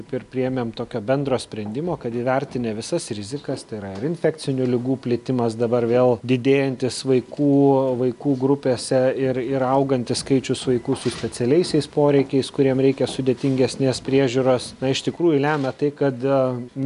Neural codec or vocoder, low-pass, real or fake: none; 10.8 kHz; real